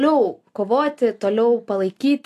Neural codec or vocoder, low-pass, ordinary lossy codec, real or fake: none; 14.4 kHz; AAC, 64 kbps; real